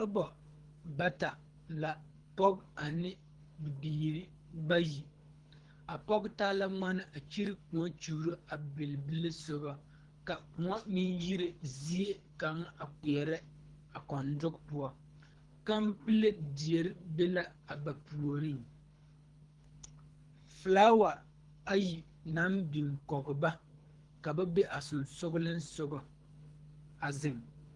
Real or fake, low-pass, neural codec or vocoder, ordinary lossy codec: fake; 10.8 kHz; codec, 24 kHz, 3 kbps, HILCodec; Opus, 24 kbps